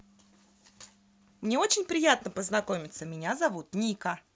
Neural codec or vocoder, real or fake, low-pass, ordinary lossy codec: none; real; none; none